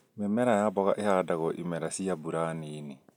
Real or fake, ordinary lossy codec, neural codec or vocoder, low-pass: real; none; none; 19.8 kHz